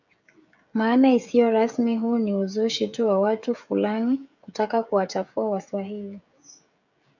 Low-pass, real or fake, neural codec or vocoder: 7.2 kHz; fake; codec, 16 kHz, 16 kbps, FreqCodec, smaller model